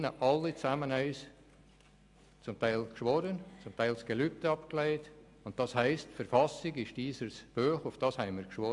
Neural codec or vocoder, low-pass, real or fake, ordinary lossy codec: none; 10.8 kHz; real; AAC, 64 kbps